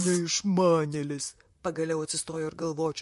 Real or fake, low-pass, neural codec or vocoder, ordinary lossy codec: fake; 14.4 kHz; vocoder, 44.1 kHz, 128 mel bands, Pupu-Vocoder; MP3, 48 kbps